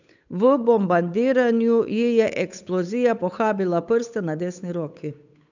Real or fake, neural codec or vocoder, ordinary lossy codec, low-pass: fake; codec, 16 kHz, 8 kbps, FunCodec, trained on Chinese and English, 25 frames a second; none; 7.2 kHz